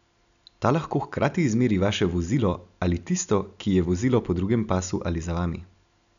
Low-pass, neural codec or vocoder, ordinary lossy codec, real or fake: 7.2 kHz; none; none; real